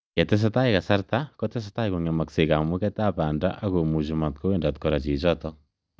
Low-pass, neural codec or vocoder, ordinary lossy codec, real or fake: none; none; none; real